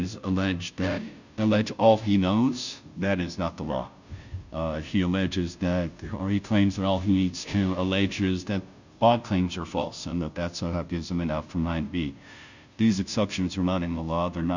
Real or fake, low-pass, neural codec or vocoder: fake; 7.2 kHz; codec, 16 kHz, 0.5 kbps, FunCodec, trained on Chinese and English, 25 frames a second